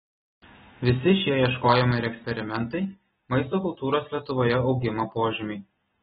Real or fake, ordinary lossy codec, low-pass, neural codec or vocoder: real; AAC, 16 kbps; 9.9 kHz; none